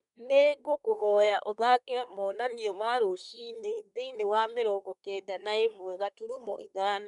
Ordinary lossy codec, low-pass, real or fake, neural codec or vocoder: none; 10.8 kHz; fake; codec, 24 kHz, 1 kbps, SNAC